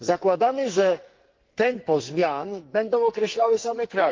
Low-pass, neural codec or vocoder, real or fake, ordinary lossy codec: 7.2 kHz; codec, 44.1 kHz, 2.6 kbps, SNAC; fake; Opus, 32 kbps